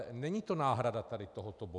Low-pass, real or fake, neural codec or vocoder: 10.8 kHz; real; none